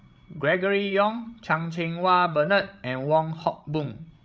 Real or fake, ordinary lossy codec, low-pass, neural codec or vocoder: fake; none; none; codec, 16 kHz, 16 kbps, FreqCodec, larger model